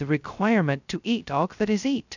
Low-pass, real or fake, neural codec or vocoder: 7.2 kHz; fake; codec, 16 kHz, 0.2 kbps, FocalCodec